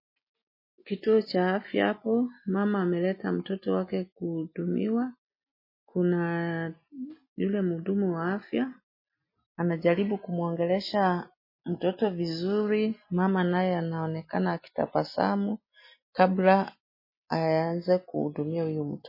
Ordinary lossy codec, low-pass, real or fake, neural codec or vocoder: MP3, 24 kbps; 5.4 kHz; real; none